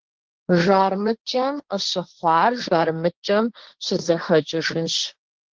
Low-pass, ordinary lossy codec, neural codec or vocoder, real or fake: 7.2 kHz; Opus, 16 kbps; codec, 16 kHz, 1.1 kbps, Voila-Tokenizer; fake